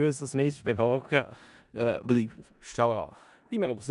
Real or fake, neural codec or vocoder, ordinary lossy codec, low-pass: fake; codec, 16 kHz in and 24 kHz out, 0.4 kbps, LongCat-Audio-Codec, four codebook decoder; none; 10.8 kHz